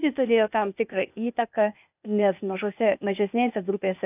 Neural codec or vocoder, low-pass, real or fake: codec, 16 kHz, 0.8 kbps, ZipCodec; 3.6 kHz; fake